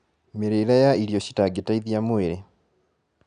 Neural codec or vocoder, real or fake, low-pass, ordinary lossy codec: none; real; 9.9 kHz; none